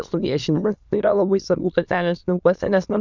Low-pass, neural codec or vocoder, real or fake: 7.2 kHz; autoencoder, 22.05 kHz, a latent of 192 numbers a frame, VITS, trained on many speakers; fake